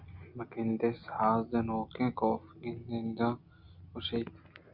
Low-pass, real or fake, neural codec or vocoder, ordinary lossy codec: 5.4 kHz; real; none; Opus, 64 kbps